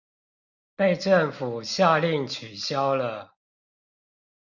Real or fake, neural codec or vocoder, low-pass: real; none; 7.2 kHz